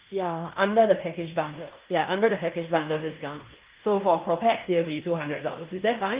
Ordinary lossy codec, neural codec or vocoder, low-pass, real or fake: Opus, 32 kbps; codec, 16 kHz in and 24 kHz out, 0.9 kbps, LongCat-Audio-Codec, fine tuned four codebook decoder; 3.6 kHz; fake